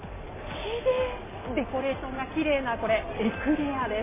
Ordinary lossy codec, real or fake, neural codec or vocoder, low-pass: MP3, 16 kbps; real; none; 3.6 kHz